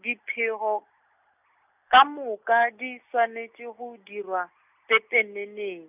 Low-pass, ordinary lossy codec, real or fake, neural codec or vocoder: 3.6 kHz; none; real; none